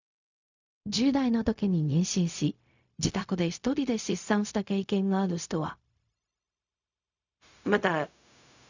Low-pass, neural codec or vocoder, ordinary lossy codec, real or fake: 7.2 kHz; codec, 16 kHz, 0.4 kbps, LongCat-Audio-Codec; none; fake